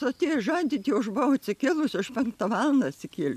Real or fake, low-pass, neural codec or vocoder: real; 14.4 kHz; none